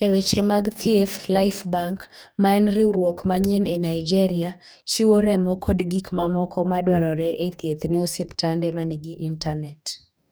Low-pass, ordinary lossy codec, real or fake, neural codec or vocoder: none; none; fake; codec, 44.1 kHz, 2.6 kbps, DAC